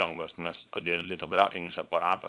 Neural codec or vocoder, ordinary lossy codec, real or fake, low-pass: codec, 24 kHz, 0.9 kbps, WavTokenizer, small release; MP3, 96 kbps; fake; 10.8 kHz